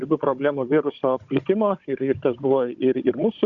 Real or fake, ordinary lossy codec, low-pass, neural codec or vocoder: fake; Opus, 64 kbps; 7.2 kHz; codec, 16 kHz, 16 kbps, FunCodec, trained on Chinese and English, 50 frames a second